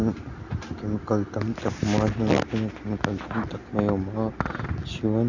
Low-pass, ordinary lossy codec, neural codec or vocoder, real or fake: 7.2 kHz; Opus, 64 kbps; none; real